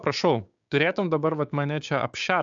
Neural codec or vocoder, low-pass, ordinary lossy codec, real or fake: codec, 16 kHz, 6 kbps, DAC; 7.2 kHz; AAC, 64 kbps; fake